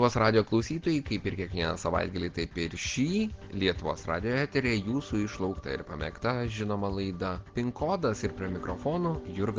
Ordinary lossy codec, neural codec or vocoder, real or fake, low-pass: Opus, 16 kbps; none; real; 7.2 kHz